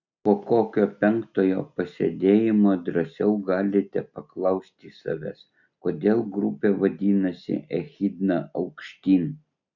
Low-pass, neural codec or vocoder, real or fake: 7.2 kHz; none; real